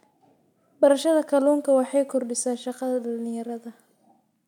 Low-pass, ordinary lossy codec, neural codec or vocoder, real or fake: 19.8 kHz; none; none; real